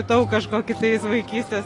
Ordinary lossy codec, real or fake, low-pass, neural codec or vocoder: AAC, 32 kbps; real; 10.8 kHz; none